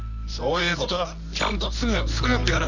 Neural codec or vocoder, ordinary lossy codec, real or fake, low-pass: codec, 24 kHz, 0.9 kbps, WavTokenizer, medium music audio release; none; fake; 7.2 kHz